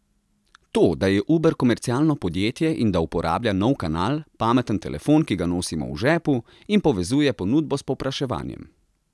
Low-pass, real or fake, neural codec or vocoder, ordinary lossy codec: none; real; none; none